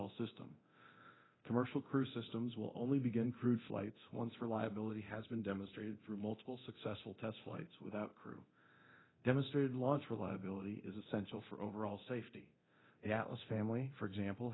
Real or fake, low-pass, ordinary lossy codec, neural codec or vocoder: fake; 7.2 kHz; AAC, 16 kbps; codec, 24 kHz, 0.9 kbps, DualCodec